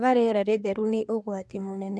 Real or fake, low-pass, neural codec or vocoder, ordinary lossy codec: fake; none; codec, 24 kHz, 1 kbps, SNAC; none